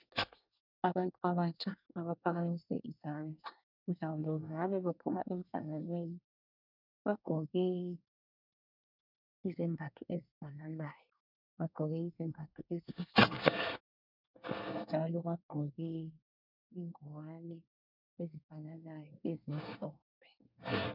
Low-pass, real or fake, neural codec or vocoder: 5.4 kHz; fake; codec, 24 kHz, 1 kbps, SNAC